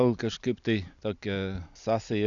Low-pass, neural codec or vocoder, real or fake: 7.2 kHz; none; real